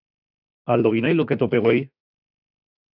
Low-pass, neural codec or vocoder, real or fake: 5.4 kHz; autoencoder, 48 kHz, 32 numbers a frame, DAC-VAE, trained on Japanese speech; fake